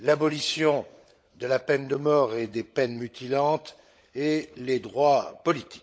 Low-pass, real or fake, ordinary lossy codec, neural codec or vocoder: none; fake; none; codec, 16 kHz, 16 kbps, FunCodec, trained on LibriTTS, 50 frames a second